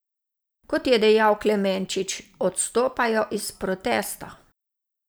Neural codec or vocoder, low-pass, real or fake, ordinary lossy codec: vocoder, 44.1 kHz, 128 mel bands every 512 samples, BigVGAN v2; none; fake; none